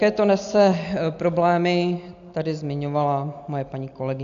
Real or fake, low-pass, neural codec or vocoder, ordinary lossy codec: real; 7.2 kHz; none; AAC, 64 kbps